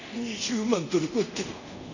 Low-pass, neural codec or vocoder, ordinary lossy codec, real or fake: 7.2 kHz; codec, 24 kHz, 0.5 kbps, DualCodec; none; fake